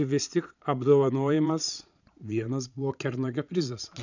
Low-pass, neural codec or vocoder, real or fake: 7.2 kHz; vocoder, 22.05 kHz, 80 mel bands, Vocos; fake